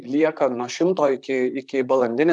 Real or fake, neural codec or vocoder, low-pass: fake; vocoder, 44.1 kHz, 128 mel bands, Pupu-Vocoder; 10.8 kHz